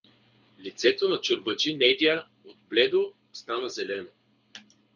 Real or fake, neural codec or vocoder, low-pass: fake; codec, 24 kHz, 6 kbps, HILCodec; 7.2 kHz